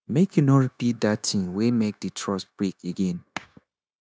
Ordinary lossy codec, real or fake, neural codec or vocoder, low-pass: none; fake; codec, 16 kHz, 0.9 kbps, LongCat-Audio-Codec; none